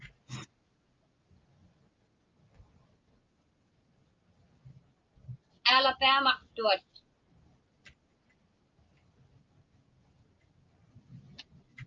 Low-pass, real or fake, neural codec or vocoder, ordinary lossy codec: 7.2 kHz; real; none; Opus, 24 kbps